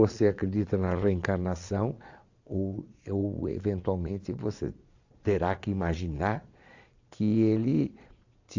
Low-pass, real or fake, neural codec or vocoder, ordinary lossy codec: 7.2 kHz; fake; vocoder, 22.05 kHz, 80 mel bands, Vocos; AAC, 48 kbps